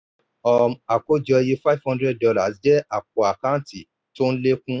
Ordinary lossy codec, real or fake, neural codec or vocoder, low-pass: none; real; none; none